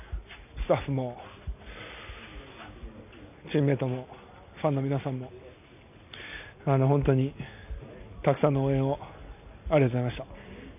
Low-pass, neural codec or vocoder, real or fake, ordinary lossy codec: 3.6 kHz; none; real; none